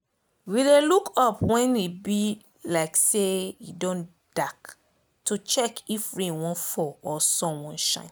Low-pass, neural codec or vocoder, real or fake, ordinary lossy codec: none; none; real; none